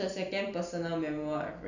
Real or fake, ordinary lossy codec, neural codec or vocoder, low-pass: real; none; none; 7.2 kHz